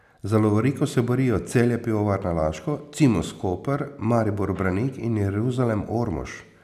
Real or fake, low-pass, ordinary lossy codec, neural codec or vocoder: real; 14.4 kHz; none; none